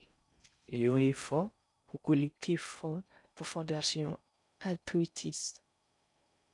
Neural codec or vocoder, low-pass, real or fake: codec, 16 kHz in and 24 kHz out, 0.6 kbps, FocalCodec, streaming, 4096 codes; 10.8 kHz; fake